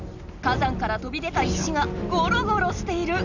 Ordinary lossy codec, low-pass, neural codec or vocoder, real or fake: none; 7.2 kHz; none; real